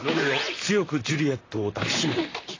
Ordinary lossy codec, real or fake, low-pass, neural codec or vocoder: AAC, 32 kbps; fake; 7.2 kHz; vocoder, 44.1 kHz, 128 mel bands, Pupu-Vocoder